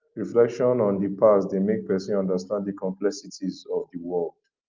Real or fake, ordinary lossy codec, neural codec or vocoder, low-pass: real; Opus, 24 kbps; none; 7.2 kHz